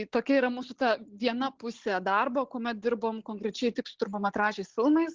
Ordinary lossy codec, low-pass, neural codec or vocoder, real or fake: Opus, 16 kbps; 7.2 kHz; none; real